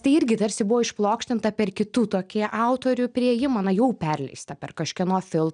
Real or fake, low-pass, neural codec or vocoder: real; 9.9 kHz; none